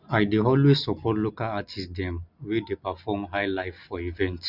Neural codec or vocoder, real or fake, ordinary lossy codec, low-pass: none; real; none; 5.4 kHz